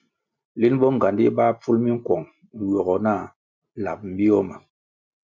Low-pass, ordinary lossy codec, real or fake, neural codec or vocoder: 7.2 kHz; MP3, 64 kbps; real; none